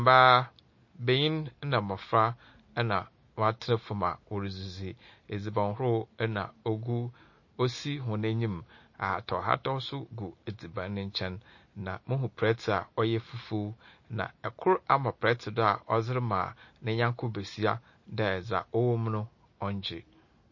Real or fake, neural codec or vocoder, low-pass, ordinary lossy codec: real; none; 7.2 kHz; MP3, 32 kbps